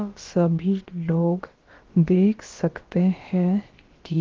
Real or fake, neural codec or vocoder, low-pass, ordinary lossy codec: fake; codec, 16 kHz, about 1 kbps, DyCAST, with the encoder's durations; 7.2 kHz; Opus, 16 kbps